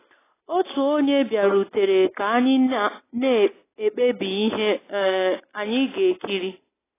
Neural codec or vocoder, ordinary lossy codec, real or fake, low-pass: none; AAC, 16 kbps; real; 3.6 kHz